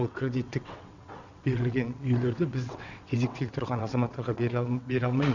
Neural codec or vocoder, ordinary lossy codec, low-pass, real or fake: vocoder, 22.05 kHz, 80 mel bands, WaveNeXt; none; 7.2 kHz; fake